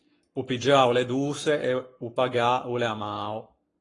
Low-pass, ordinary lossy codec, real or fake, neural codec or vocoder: 10.8 kHz; AAC, 32 kbps; fake; codec, 44.1 kHz, 7.8 kbps, Pupu-Codec